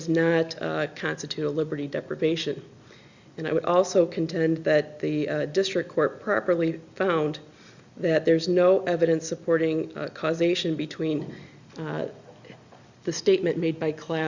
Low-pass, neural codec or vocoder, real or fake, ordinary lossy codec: 7.2 kHz; none; real; Opus, 64 kbps